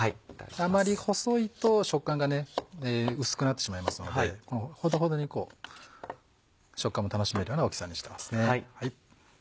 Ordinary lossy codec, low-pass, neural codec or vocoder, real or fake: none; none; none; real